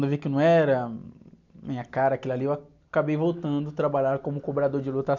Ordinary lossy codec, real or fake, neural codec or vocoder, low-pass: none; real; none; 7.2 kHz